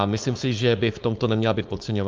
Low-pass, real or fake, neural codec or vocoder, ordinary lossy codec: 7.2 kHz; fake; codec, 16 kHz, 4.8 kbps, FACodec; Opus, 32 kbps